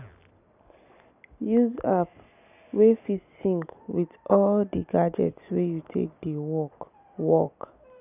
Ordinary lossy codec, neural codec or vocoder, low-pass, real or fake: none; none; 3.6 kHz; real